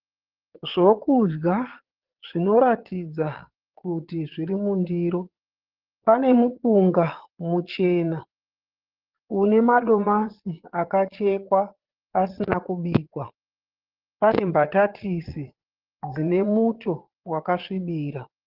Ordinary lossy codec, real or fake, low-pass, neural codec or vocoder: Opus, 16 kbps; fake; 5.4 kHz; vocoder, 22.05 kHz, 80 mel bands, Vocos